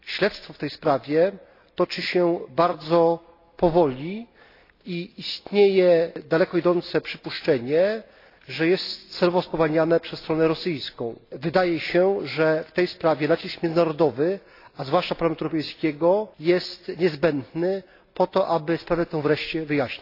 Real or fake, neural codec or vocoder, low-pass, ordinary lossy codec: real; none; 5.4 kHz; AAC, 32 kbps